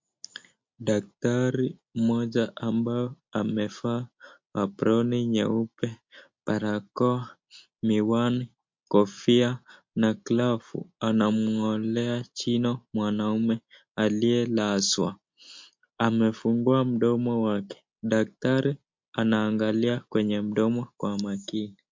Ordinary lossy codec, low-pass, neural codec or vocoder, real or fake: MP3, 48 kbps; 7.2 kHz; none; real